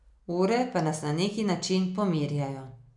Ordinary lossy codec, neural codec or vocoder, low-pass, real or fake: none; none; 10.8 kHz; real